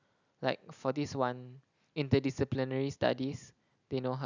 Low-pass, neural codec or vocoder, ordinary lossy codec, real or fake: 7.2 kHz; none; none; real